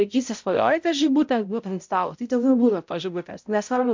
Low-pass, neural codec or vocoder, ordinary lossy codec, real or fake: 7.2 kHz; codec, 16 kHz, 0.5 kbps, X-Codec, HuBERT features, trained on balanced general audio; MP3, 64 kbps; fake